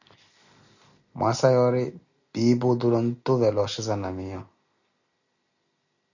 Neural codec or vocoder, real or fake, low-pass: none; real; 7.2 kHz